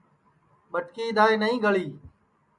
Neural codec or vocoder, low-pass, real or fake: none; 10.8 kHz; real